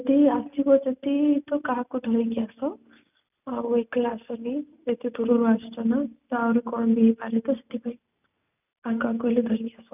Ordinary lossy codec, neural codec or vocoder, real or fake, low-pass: none; none; real; 3.6 kHz